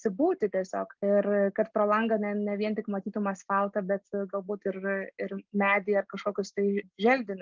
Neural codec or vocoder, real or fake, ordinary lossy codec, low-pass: none; real; Opus, 24 kbps; 7.2 kHz